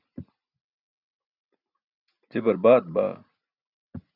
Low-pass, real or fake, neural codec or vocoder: 5.4 kHz; real; none